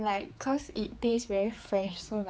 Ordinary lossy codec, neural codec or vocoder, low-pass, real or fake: none; codec, 16 kHz, 4 kbps, X-Codec, HuBERT features, trained on general audio; none; fake